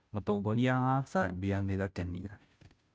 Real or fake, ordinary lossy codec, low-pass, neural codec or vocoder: fake; none; none; codec, 16 kHz, 0.5 kbps, FunCodec, trained on Chinese and English, 25 frames a second